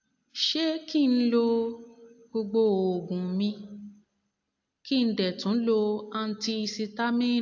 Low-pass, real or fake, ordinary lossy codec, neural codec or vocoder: 7.2 kHz; real; AAC, 48 kbps; none